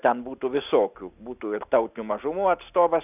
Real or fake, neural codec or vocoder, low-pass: real; none; 3.6 kHz